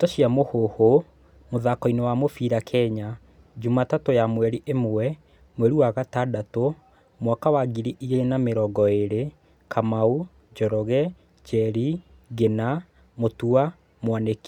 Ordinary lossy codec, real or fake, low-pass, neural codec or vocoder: none; real; 19.8 kHz; none